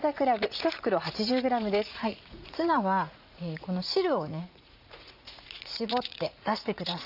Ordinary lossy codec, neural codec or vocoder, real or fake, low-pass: none; vocoder, 44.1 kHz, 80 mel bands, Vocos; fake; 5.4 kHz